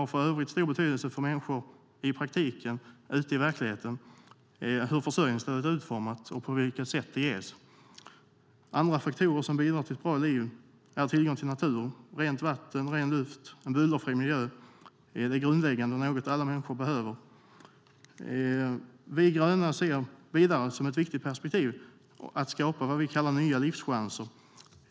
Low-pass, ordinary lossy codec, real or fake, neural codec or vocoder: none; none; real; none